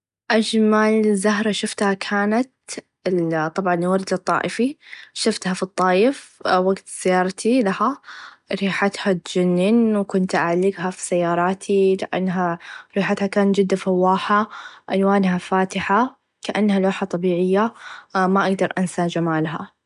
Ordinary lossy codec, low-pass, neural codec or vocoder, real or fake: none; 10.8 kHz; none; real